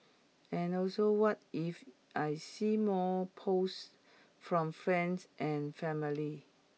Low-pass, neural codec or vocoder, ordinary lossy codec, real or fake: none; none; none; real